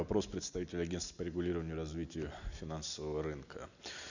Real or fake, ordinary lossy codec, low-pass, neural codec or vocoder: real; none; 7.2 kHz; none